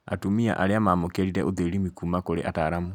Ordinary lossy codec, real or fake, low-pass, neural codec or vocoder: none; real; 19.8 kHz; none